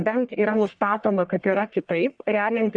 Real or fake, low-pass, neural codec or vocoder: fake; 9.9 kHz; codec, 44.1 kHz, 1.7 kbps, Pupu-Codec